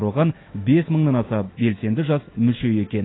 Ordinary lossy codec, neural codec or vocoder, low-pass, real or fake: AAC, 16 kbps; autoencoder, 48 kHz, 128 numbers a frame, DAC-VAE, trained on Japanese speech; 7.2 kHz; fake